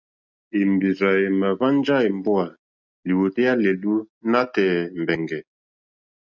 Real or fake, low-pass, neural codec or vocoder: real; 7.2 kHz; none